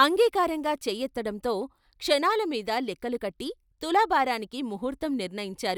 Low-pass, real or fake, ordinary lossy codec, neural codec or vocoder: none; real; none; none